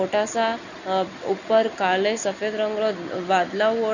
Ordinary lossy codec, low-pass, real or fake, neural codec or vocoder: none; 7.2 kHz; real; none